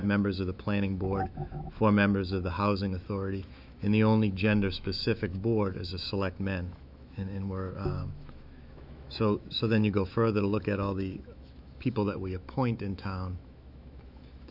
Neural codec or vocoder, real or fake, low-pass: autoencoder, 48 kHz, 128 numbers a frame, DAC-VAE, trained on Japanese speech; fake; 5.4 kHz